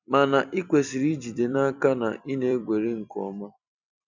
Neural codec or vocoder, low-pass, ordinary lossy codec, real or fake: vocoder, 44.1 kHz, 128 mel bands every 256 samples, BigVGAN v2; 7.2 kHz; none; fake